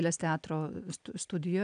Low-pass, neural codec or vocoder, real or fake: 9.9 kHz; none; real